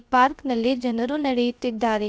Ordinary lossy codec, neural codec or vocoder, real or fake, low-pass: none; codec, 16 kHz, about 1 kbps, DyCAST, with the encoder's durations; fake; none